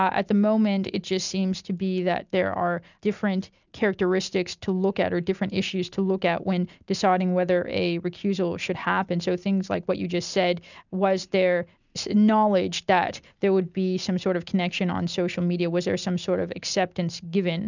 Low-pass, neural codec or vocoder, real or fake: 7.2 kHz; none; real